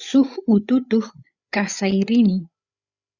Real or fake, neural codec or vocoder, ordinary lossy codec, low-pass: fake; codec, 16 kHz, 8 kbps, FreqCodec, larger model; Opus, 64 kbps; 7.2 kHz